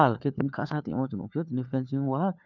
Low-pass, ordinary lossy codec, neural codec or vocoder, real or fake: 7.2 kHz; none; codec, 16 kHz, 4 kbps, FunCodec, trained on LibriTTS, 50 frames a second; fake